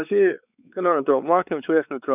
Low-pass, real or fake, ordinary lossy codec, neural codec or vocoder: 3.6 kHz; fake; none; codec, 16 kHz, 4 kbps, X-Codec, WavLM features, trained on Multilingual LibriSpeech